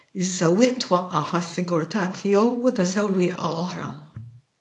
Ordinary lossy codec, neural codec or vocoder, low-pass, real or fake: AAC, 48 kbps; codec, 24 kHz, 0.9 kbps, WavTokenizer, small release; 10.8 kHz; fake